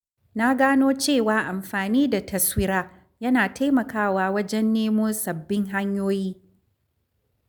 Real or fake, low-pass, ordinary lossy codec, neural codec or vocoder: real; none; none; none